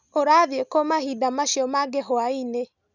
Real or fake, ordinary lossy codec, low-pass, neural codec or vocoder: real; none; 7.2 kHz; none